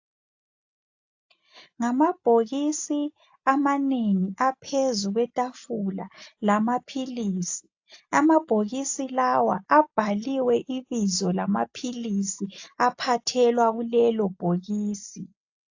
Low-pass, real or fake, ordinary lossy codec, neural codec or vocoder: 7.2 kHz; real; AAC, 48 kbps; none